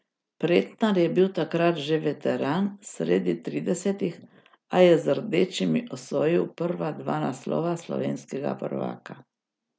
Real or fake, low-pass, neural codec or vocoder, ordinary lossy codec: real; none; none; none